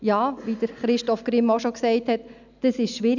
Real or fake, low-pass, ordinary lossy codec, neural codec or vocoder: real; 7.2 kHz; none; none